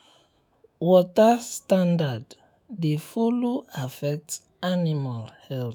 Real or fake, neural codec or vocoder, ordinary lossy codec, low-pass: fake; autoencoder, 48 kHz, 128 numbers a frame, DAC-VAE, trained on Japanese speech; none; none